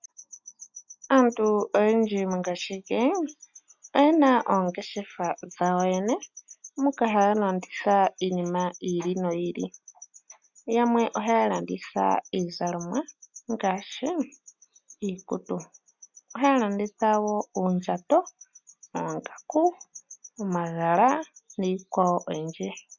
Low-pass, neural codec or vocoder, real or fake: 7.2 kHz; none; real